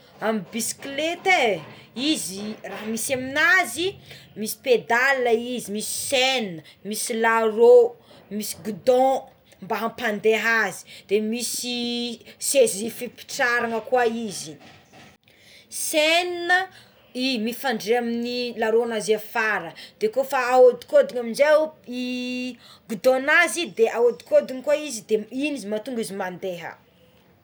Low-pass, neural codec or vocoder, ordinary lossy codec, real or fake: none; none; none; real